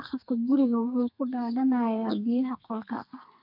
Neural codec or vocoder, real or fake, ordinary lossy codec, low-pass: codec, 32 kHz, 1.9 kbps, SNAC; fake; none; 5.4 kHz